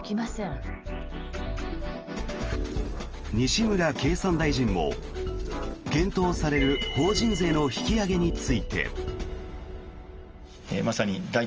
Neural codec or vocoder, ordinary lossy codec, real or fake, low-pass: none; Opus, 24 kbps; real; 7.2 kHz